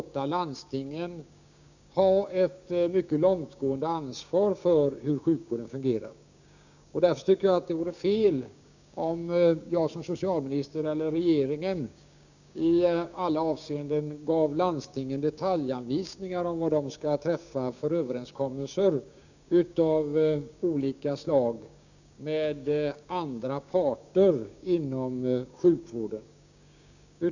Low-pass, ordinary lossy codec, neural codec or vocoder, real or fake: 7.2 kHz; none; codec, 16 kHz, 6 kbps, DAC; fake